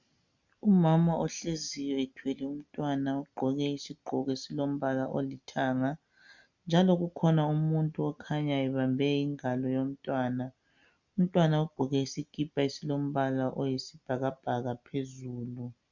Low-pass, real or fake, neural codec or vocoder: 7.2 kHz; real; none